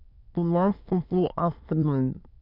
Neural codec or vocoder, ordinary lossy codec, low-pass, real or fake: autoencoder, 22.05 kHz, a latent of 192 numbers a frame, VITS, trained on many speakers; none; 5.4 kHz; fake